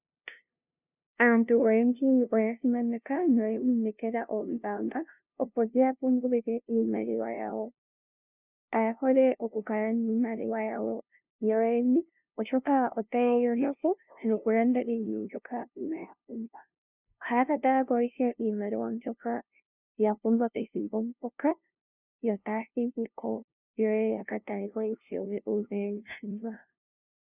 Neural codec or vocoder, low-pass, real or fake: codec, 16 kHz, 0.5 kbps, FunCodec, trained on LibriTTS, 25 frames a second; 3.6 kHz; fake